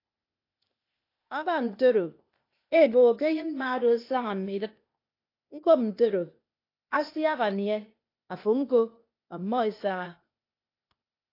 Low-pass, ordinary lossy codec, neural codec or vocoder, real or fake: 5.4 kHz; AAC, 32 kbps; codec, 16 kHz, 0.8 kbps, ZipCodec; fake